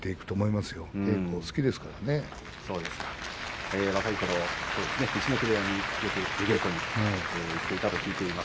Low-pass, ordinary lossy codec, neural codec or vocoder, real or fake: none; none; none; real